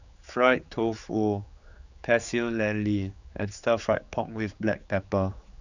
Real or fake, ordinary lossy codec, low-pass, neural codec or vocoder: fake; none; 7.2 kHz; codec, 16 kHz, 4 kbps, X-Codec, HuBERT features, trained on general audio